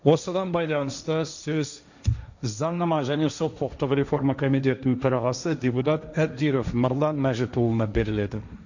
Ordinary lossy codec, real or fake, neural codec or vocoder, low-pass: none; fake; codec, 16 kHz, 1.1 kbps, Voila-Tokenizer; 7.2 kHz